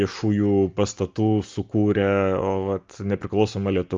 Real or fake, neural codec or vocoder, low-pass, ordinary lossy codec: real; none; 7.2 kHz; Opus, 32 kbps